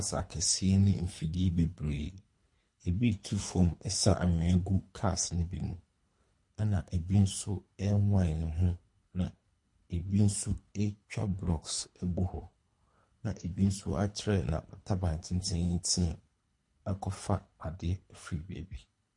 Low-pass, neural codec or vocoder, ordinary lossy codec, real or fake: 10.8 kHz; codec, 24 kHz, 3 kbps, HILCodec; MP3, 48 kbps; fake